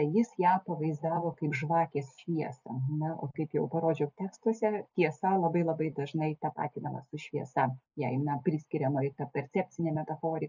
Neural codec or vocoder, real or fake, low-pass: none; real; 7.2 kHz